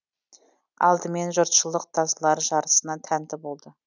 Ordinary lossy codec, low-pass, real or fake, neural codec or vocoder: none; 7.2 kHz; real; none